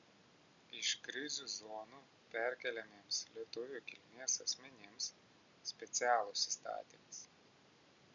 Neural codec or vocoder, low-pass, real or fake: none; 7.2 kHz; real